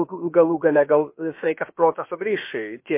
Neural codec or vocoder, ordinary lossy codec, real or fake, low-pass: codec, 16 kHz, about 1 kbps, DyCAST, with the encoder's durations; MP3, 24 kbps; fake; 3.6 kHz